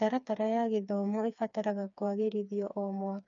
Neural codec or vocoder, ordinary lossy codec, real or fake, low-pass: codec, 16 kHz, 4 kbps, FreqCodec, smaller model; none; fake; 7.2 kHz